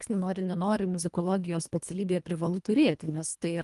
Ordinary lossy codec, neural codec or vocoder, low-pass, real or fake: Opus, 32 kbps; codec, 24 kHz, 1.5 kbps, HILCodec; 10.8 kHz; fake